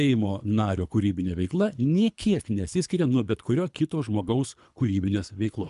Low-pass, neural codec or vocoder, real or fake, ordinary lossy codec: 10.8 kHz; codec, 24 kHz, 3 kbps, HILCodec; fake; MP3, 96 kbps